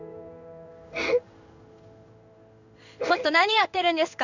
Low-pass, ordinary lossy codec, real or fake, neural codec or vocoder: 7.2 kHz; none; fake; codec, 16 kHz in and 24 kHz out, 1 kbps, XY-Tokenizer